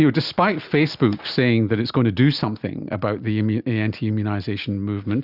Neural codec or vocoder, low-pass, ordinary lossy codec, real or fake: none; 5.4 kHz; Opus, 64 kbps; real